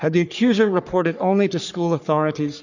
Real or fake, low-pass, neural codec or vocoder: fake; 7.2 kHz; codec, 44.1 kHz, 3.4 kbps, Pupu-Codec